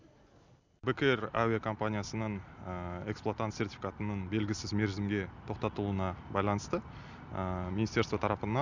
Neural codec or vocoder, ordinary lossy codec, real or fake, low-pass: none; none; real; 7.2 kHz